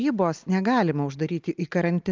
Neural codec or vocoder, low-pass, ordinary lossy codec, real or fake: none; 7.2 kHz; Opus, 24 kbps; real